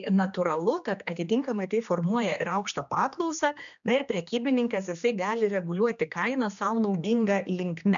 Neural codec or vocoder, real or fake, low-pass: codec, 16 kHz, 2 kbps, X-Codec, HuBERT features, trained on general audio; fake; 7.2 kHz